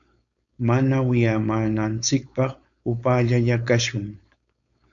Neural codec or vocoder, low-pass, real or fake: codec, 16 kHz, 4.8 kbps, FACodec; 7.2 kHz; fake